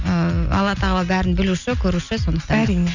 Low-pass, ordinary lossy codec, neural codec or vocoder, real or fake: 7.2 kHz; none; none; real